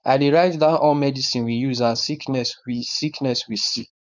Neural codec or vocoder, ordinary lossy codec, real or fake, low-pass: codec, 16 kHz, 4.8 kbps, FACodec; none; fake; 7.2 kHz